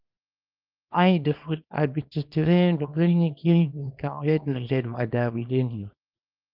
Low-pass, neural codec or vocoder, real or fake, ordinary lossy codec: 5.4 kHz; codec, 24 kHz, 0.9 kbps, WavTokenizer, small release; fake; Opus, 24 kbps